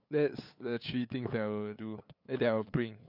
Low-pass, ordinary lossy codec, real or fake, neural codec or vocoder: 5.4 kHz; none; fake; codec, 16 kHz, 8 kbps, FunCodec, trained on LibriTTS, 25 frames a second